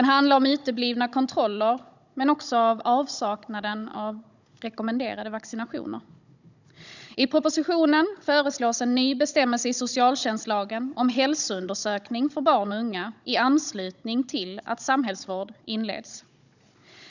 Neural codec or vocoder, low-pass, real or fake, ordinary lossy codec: codec, 16 kHz, 16 kbps, FunCodec, trained on Chinese and English, 50 frames a second; 7.2 kHz; fake; none